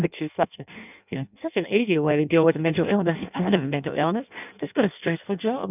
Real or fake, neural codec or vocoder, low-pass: fake; codec, 16 kHz in and 24 kHz out, 0.6 kbps, FireRedTTS-2 codec; 3.6 kHz